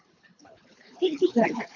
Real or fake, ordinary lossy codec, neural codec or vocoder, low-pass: fake; AAC, 48 kbps; codec, 24 kHz, 3 kbps, HILCodec; 7.2 kHz